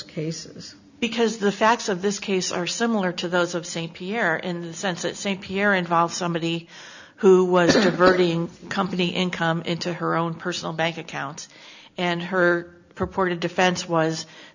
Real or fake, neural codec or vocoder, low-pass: real; none; 7.2 kHz